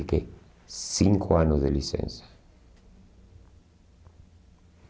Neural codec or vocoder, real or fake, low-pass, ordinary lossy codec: none; real; none; none